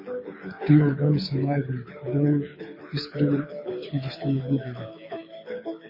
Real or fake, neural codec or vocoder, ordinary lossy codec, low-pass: fake; codec, 24 kHz, 6 kbps, HILCodec; MP3, 24 kbps; 5.4 kHz